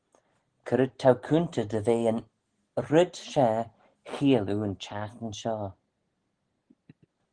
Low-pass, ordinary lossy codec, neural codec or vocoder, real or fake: 9.9 kHz; Opus, 16 kbps; none; real